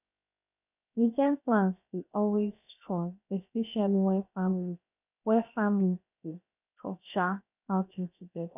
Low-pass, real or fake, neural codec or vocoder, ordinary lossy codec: 3.6 kHz; fake; codec, 16 kHz, 0.7 kbps, FocalCodec; none